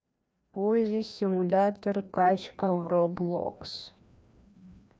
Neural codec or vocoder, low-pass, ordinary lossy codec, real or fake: codec, 16 kHz, 1 kbps, FreqCodec, larger model; none; none; fake